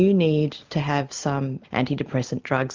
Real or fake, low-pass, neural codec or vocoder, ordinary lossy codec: real; 7.2 kHz; none; Opus, 32 kbps